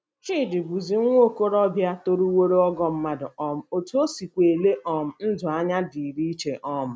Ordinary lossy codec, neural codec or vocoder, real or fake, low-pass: none; none; real; none